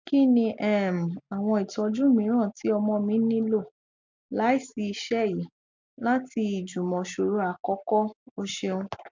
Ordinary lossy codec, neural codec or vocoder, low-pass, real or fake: MP3, 64 kbps; none; 7.2 kHz; real